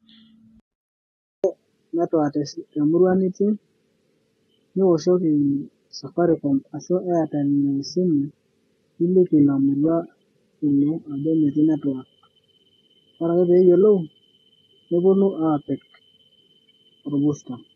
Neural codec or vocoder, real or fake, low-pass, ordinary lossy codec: none; real; 19.8 kHz; AAC, 32 kbps